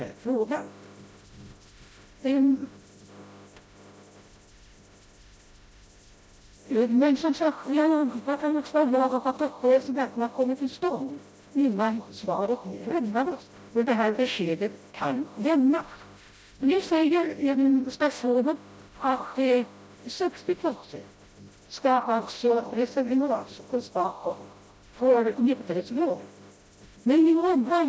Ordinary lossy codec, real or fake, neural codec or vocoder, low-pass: none; fake; codec, 16 kHz, 0.5 kbps, FreqCodec, smaller model; none